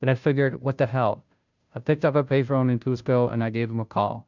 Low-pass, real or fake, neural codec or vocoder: 7.2 kHz; fake; codec, 16 kHz, 0.5 kbps, FunCodec, trained on Chinese and English, 25 frames a second